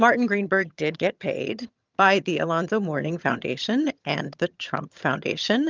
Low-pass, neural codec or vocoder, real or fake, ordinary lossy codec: 7.2 kHz; vocoder, 22.05 kHz, 80 mel bands, HiFi-GAN; fake; Opus, 24 kbps